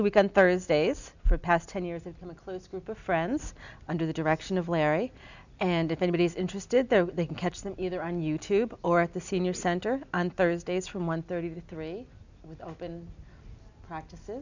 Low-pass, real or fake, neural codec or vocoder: 7.2 kHz; real; none